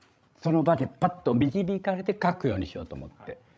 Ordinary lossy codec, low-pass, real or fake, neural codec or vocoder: none; none; fake; codec, 16 kHz, 16 kbps, FreqCodec, larger model